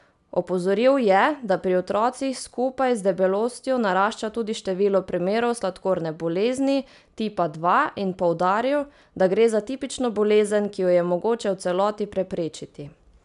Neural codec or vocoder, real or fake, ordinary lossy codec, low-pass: none; real; none; 10.8 kHz